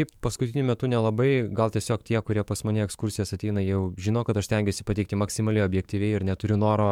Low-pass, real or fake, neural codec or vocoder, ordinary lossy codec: 19.8 kHz; fake; codec, 44.1 kHz, 7.8 kbps, DAC; MP3, 96 kbps